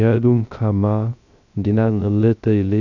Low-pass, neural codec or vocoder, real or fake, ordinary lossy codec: 7.2 kHz; codec, 16 kHz, 0.3 kbps, FocalCodec; fake; none